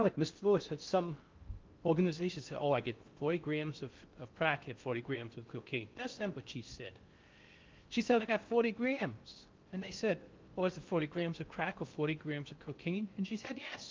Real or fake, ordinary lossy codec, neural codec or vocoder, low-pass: fake; Opus, 32 kbps; codec, 16 kHz in and 24 kHz out, 0.6 kbps, FocalCodec, streaming, 4096 codes; 7.2 kHz